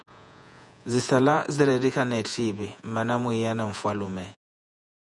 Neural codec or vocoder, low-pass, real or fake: vocoder, 48 kHz, 128 mel bands, Vocos; 10.8 kHz; fake